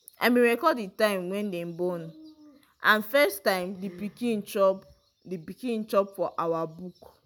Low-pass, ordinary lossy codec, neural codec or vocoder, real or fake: none; none; none; real